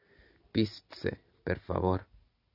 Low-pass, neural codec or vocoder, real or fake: 5.4 kHz; none; real